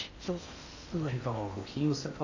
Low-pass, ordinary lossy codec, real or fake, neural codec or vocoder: 7.2 kHz; AAC, 48 kbps; fake; codec, 16 kHz in and 24 kHz out, 0.8 kbps, FocalCodec, streaming, 65536 codes